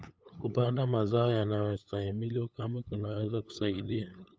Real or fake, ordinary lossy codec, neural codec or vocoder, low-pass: fake; none; codec, 16 kHz, 8 kbps, FunCodec, trained on LibriTTS, 25 frames a second; none